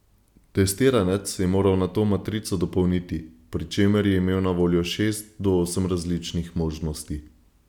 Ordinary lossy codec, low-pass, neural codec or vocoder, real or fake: none; 19.8 kHz; none; real